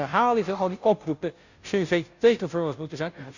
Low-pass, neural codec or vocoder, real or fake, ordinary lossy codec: 7.2 kHz; codec, 16 kHz, 0.5 kbps, FunCodec, trained on Chinese and English, 25 frames a second; fake; none